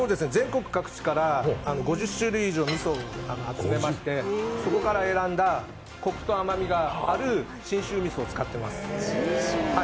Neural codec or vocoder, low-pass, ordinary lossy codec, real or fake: none; none; none; real